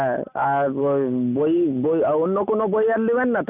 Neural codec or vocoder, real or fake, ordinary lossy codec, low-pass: none; real; none; 3.6 kHz